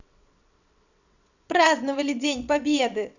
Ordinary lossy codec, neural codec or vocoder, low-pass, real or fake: none; vocoder, 22.05 kHz, 80 mel bands, WaveNeXt; 7.2 kHz; fake